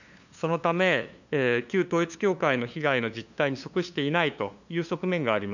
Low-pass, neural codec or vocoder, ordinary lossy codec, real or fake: 7.2 kHz; codec, 16 kHz, 2 kbps, FunCodec, trained on LibriTTS, 25 frames a second; none; fake